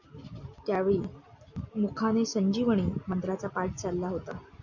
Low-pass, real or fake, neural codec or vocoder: 7.2 kHz; real; none